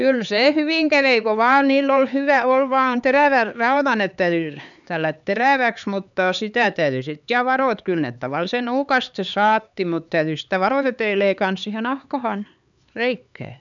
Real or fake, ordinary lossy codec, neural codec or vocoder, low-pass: fake; none; codec, 16 kHz, 4 kbps, X-Codec, HuBERT features, trained on LibriSpeech; 7.2 kHz